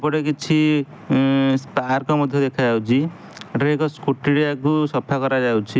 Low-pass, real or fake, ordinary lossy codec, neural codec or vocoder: none; real; none; none